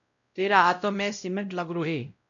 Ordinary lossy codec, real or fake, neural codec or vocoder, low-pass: MP3, 96 kbps; fake; codec, 16 kHz, 0.5 kbps, X-Codec, WavLM features, trained on Multilingual LibriSpeech; 7.2 kHz